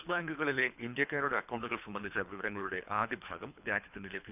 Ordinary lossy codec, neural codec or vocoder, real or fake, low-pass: none; codec, 24 kHz, 3 kbps, HILCodec; fake; 3.6 kHz